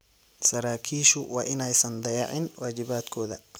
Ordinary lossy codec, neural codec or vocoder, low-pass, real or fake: none; none; none; real